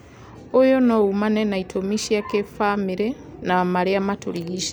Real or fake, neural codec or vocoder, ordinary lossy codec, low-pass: real; none; none; none